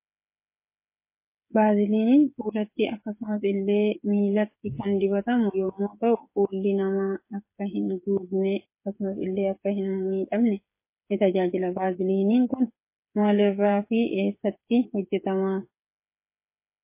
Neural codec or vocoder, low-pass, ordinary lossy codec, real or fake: codec, 16 kHz, 8 kbps, FreqCodec, smaller model; 3.6 kHz; MP3, 24 kbps; fake